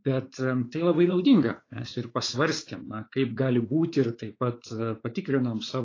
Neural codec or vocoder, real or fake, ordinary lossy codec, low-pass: codec, 16 kHz, 4 kbps, X-Codec, WavLM features, trained on Multilingual LibriSpeech; fake; AAC, 32 kbps; 7.2 kHz